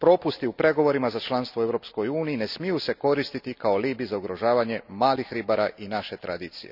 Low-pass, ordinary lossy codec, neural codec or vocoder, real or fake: 5.4 kHz; none; none; real